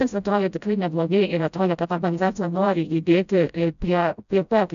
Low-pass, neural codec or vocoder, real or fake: 7.2 kHz; codec, 16 kHz, 0.5 kbps, FreqCodec, smaller model; fake